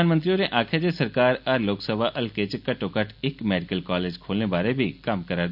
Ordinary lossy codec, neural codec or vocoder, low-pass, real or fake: none; none; 5.4 kHz; real